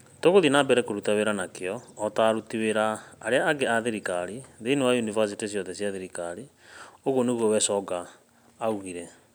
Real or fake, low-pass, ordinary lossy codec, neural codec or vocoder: real; none; none; none